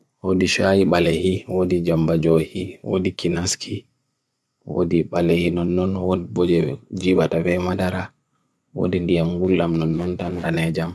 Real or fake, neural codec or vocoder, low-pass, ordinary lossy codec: fake; vocoder, 24 kHz, 100 mel bands, Vocos; none; none